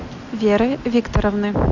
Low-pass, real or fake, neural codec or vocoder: 7.2 kHz; real; none